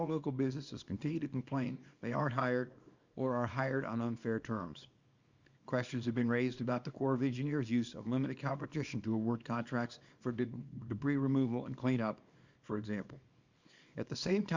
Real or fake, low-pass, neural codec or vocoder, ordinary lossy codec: fake; 7.2 kHz; codec, 24 kHz, 0.9 kbps, WavTokenizer, small release; Opus, 64 kbps